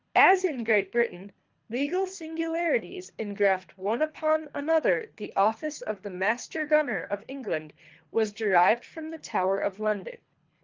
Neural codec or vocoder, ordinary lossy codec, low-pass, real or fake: codec, 24 kHz, 3 kbps, HILCodec; Opus, 32 kbps; 7.2 kHz; fake